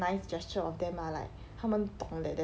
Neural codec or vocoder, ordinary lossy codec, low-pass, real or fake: none; none; none; real